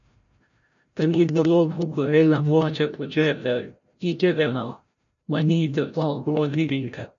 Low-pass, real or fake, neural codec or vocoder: 7.2 kHz; fake; codec, 16 kHz, 0.5 kbps, FreqCodec, larger model